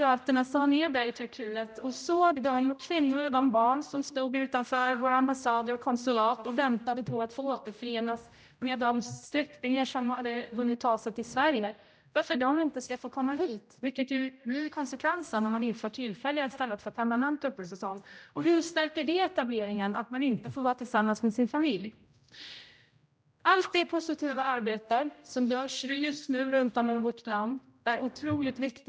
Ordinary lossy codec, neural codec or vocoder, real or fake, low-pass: none; codec, 16 kHz, 0.5 kbps, X-Codec, HuBERT features, trained on general audio; fake; none